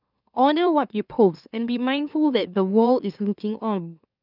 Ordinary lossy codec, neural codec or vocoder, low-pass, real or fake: none; autoencoder, 44.1 kHz, a latent of 192 numbers a frame, MeloTTS; 5.4 kHz; fake